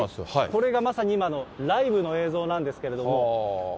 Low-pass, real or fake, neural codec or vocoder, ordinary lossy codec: none; real; none; none